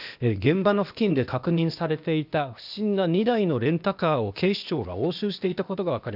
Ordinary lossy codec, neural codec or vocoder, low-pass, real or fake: none; codec, 16 kHz, 0.8 kbps, ZipCodec; 5.4 kHz; fake